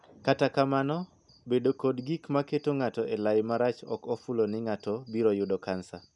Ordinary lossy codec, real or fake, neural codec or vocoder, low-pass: none; real; none; 10.8 kHz